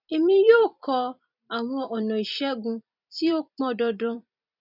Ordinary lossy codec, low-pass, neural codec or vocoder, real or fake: none; 5.4 kHz; vocoder, 44.1 kHz, 128 mel bands every 256 samples, BigVGAN v2; fake